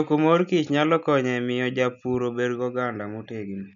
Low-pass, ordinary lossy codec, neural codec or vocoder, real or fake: 7.2 kHz; none; none; real